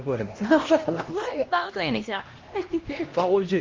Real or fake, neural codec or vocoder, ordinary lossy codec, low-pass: fake; codec, 16 kHz, 1 kbps, X-Codec, HuBERT features, trained on LibriSpeech; Opus, 32 kbps; 7.2 kHz